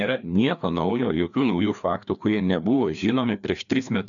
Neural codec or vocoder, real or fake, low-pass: codec, 16 kHz, 2 kbps, FreqCodec, larger model; fake; 7.2 kHz